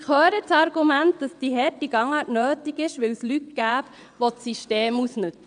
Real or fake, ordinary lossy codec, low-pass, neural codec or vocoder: fake; none; 9.9 kHz; vocoder, 22.05 kHz, 80 mel bands, WaveNeXt